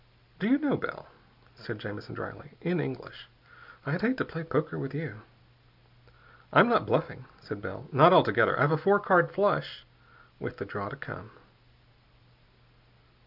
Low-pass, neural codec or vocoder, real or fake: 5.4 kHz; none; real